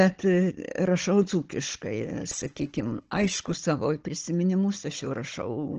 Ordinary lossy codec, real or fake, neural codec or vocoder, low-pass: Opus, 16 kbps; fake; codec, 16 kHz, 16 kbps, FunCodec, trained on Chinese and English, 50 frames a second; 7.2 kHz